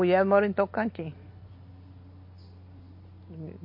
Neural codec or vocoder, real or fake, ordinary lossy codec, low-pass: none; real; MP3, 32 kbps; 5.4 kHz